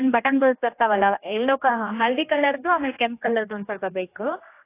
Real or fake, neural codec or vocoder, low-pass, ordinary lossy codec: fake; codec, 16 kHz, 1 kbps, X-Codec, HuBERT features, trained on general audio; 3.6 kHz; AAC, 24 kbps